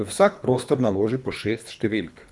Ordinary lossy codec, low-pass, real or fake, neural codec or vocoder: none; none; fake; codec, 24 kHz, 3 kbps, HILCodec